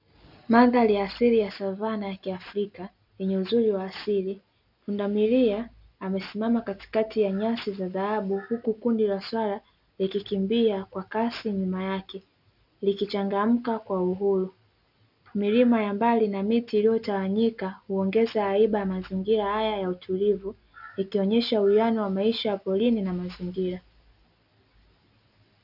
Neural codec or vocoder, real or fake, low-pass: none; real; 5.4 kHz